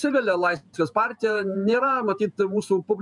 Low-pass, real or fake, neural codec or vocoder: 10.8 kHz; fake; vocoder, 48 kHz, 128 mel bands, Vocos